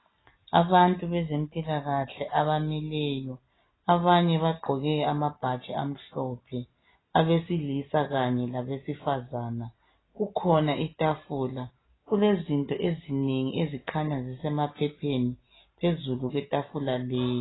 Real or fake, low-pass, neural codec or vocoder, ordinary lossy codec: real; 7.2 kHz; none; AAC, 16 kbps